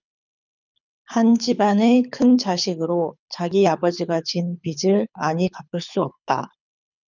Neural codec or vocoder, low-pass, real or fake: codec, 24 kHz, 6 kbps, HILCodec; 7.2 kHz; fake